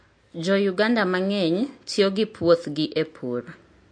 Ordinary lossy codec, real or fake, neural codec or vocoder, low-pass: MP3, 48 kbps; real; none; 9.9 kHz